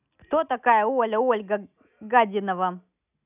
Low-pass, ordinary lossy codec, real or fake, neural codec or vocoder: 3.6 kHz; none; real; none